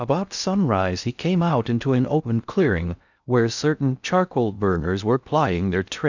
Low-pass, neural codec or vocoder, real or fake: 7.2 kHz; codec, 16 kHz in and 24 kHz out, 0.6 kbps, FocalCodec, streaming, 2048 codes; fake